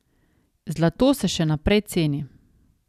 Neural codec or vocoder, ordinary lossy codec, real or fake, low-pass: none; none; real; 14.4 kHz